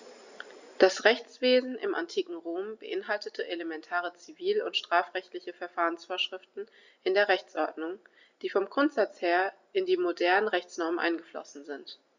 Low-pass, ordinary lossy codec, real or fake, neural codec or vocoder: 7.2 kHz; Opus, 64 kbps; real; none